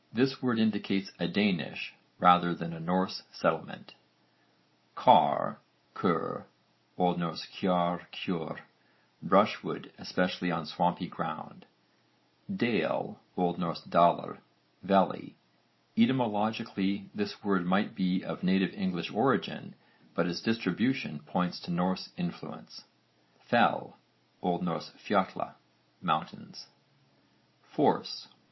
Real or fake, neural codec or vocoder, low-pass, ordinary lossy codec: real; none; 7.2 kHz; MP3, 24 kbps